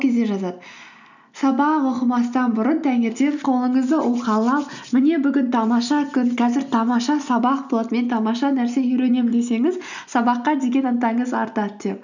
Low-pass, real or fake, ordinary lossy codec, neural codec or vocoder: 7.2 kHz; real; none; none